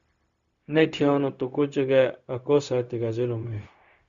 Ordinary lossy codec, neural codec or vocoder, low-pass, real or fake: Opus, 64 kbps; codec, 16 kHz, 0.4 kbps, LongCat-Audio-Codec; 7.2 kHz; fake